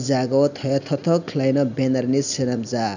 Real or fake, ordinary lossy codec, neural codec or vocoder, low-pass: real; none; none; 7.2 kHz